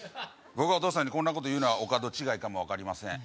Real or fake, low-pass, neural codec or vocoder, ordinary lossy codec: real; none; none; none